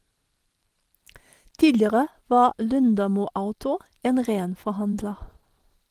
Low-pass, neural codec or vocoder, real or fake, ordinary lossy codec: 14.4 kHz; vocoder, 44.1 kHz, 128 mel bands every 512 samples, BigVGAN v2; fake; Opus, 24 kbps